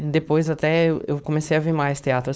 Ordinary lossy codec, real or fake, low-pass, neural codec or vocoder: none; fake; none; codec, 16 kHz, 4.8 kbps, FACodec